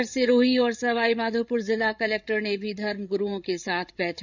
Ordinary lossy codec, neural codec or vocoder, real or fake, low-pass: none; codec, 16 kHz, 8 kbps, FreqCodec, larger model; fake; 7.2 kHz